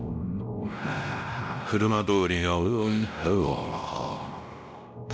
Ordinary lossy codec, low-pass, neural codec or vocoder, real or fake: none; none; codec, 16 kHz, 0.5 kbps, X-Codec, WavLM features, trained on Multilingual LibriSpeech; fake